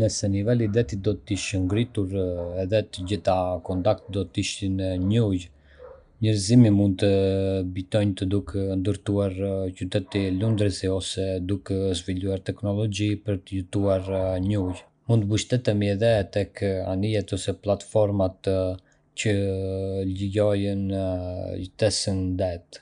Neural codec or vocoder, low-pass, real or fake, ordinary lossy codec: none; 9.9 kHz; real; none